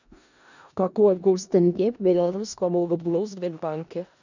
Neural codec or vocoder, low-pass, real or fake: codec, 16 kHz in and 24 kHz out, 0.4 kbps, LongCat-Audio-Codec, four codebook decoder; 7.2 kHz; fake